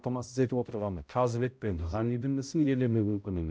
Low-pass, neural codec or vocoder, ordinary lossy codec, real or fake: none; codec, 16 kHz, 0.5 kbps, X-Codec, HuBERT features, trained on balanced general audio; none; fake